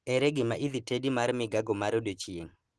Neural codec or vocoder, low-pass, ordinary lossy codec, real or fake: none; 10.8 kHz; Opus, 16 kbps; real